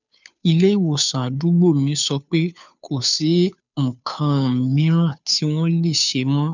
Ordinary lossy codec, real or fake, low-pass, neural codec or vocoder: none; fake; 7.2 kHz; codec, 16 kHz, 2 kbps, FunCodec, trained on Chinese and English, 25 frames a second